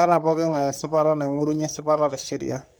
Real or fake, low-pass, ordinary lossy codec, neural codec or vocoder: fake; none; none; codec, 44.1 kHz, 3.4 kbps, Pupu-Codec